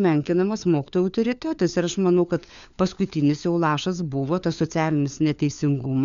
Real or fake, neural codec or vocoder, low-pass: fake; codec, 16 kHz, 4 kbps, FunCodec, trained on LibriTTS, 50 frames a second; 7.2 kHz